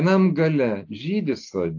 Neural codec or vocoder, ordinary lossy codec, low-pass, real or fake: none; AAC, 48 kbps; 7.2 kHz; real